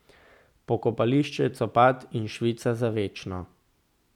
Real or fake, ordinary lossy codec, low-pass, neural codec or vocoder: real; none; 19.8 kHz; none